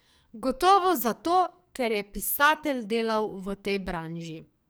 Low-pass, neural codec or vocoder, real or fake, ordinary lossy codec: none; codec, 44.1 kHz, 2.6 kbps, SNAC; fake; none